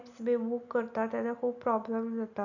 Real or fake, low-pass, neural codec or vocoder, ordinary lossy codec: real; 7.2 kHz; none; none